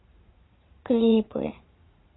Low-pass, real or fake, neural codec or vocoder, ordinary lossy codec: 7.2 kHz; fake; vocoder, 44.1 kHz, 80 mel bands, Vocos; AAC, 16 kbps